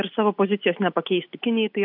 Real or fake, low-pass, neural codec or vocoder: real; 5.4 kHz; none